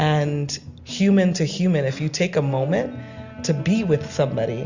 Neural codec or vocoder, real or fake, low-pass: none; real; 7.2 kHz